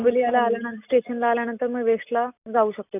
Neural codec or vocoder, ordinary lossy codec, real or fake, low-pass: none; none; real; 3.6 kHz